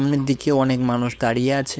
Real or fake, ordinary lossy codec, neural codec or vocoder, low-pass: fake; none; codec, 16 kHz, 4.8 kbps, FACodec; none